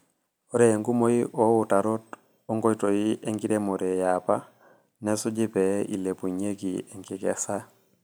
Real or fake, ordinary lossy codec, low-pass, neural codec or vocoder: real; none; none; none